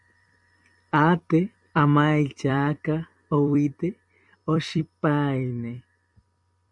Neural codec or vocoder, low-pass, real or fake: vocoder, 44.1 kHz, 128 mel bands every 256 samples, BigVGAN v2; 10.8 kHz; fake